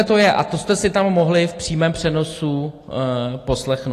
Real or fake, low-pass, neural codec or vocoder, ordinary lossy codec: real; 14.4 kHz; none; AAC, 48 kbps